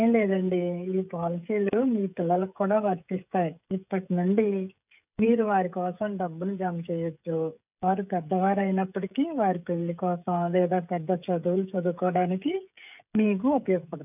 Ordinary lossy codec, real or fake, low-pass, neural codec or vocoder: none; fake; 3.6 kHz; codec, 16 kHz, 16 kbps, FreqCodec, smaller model